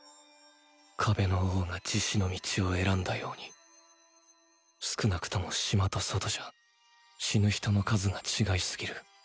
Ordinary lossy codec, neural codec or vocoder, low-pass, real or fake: none; none; none; real